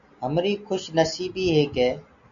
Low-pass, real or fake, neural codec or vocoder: 7.2 kHz; real; none